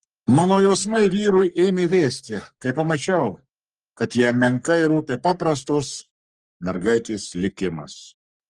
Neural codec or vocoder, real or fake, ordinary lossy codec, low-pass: codec, 44.1 kHz, 3.4 kbps, Pupu-Codec; fake; Opus, 24 kbps; 10.8 kHz